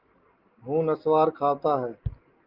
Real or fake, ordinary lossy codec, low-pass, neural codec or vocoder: real; Opus, 32 kbps; 5.4 kHz; none